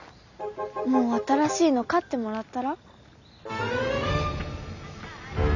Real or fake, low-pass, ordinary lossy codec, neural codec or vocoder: real; 7.2 kHz; none; none